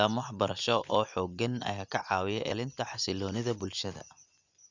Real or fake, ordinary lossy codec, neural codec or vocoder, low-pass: fake; none; vocoder, 24 kHz, 100 mel bands, Vocos; 7.2 kHz